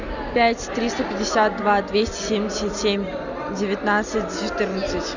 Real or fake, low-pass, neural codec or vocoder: real; 7.2 kHz; none